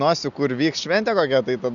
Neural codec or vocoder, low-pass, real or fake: none; 7.2 kHz; real